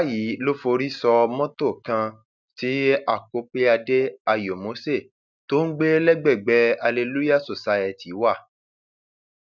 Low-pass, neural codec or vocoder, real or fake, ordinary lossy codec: 7.2 kHz; none; real; none